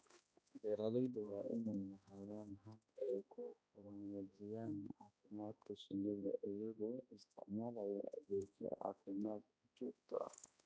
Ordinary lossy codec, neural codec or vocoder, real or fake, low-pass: none; codec, 16 kHz, 1 kbps, X-Codec, HuBERT features, trained on balanced general audio; fake; none